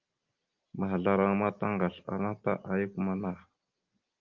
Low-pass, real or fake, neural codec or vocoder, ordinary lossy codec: 7.2 kHz; real; none; Opus, 24 kbps